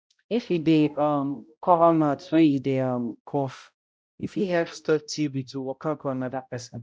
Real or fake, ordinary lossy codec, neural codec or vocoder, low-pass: fake; none; codec, 16 kHz, 0.5 kbps, X-Codec, HuBERT features, trained on balanced general audio; none